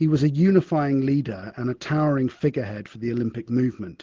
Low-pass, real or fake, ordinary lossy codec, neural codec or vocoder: 7.2 kHz; real; Opus, 16 kbps; none